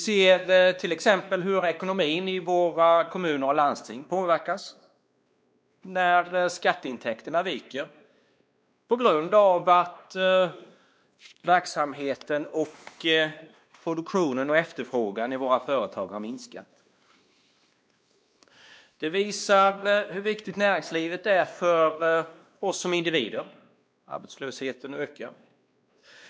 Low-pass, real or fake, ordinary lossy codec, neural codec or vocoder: none; fake; none; codec, 16 kHz, 2 kbps, X-Codec, WavLM features, trained on Multilingual LibriSpeech